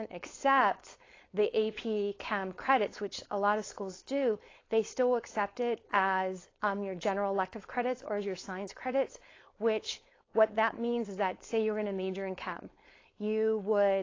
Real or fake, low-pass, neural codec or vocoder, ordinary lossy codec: fake; 7.2 kHz; codec, 16 kHz, 4.8 kbps, FACodec; AAC, 32 kbps